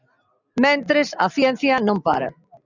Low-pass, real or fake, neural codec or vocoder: 7.2 kHz; real; none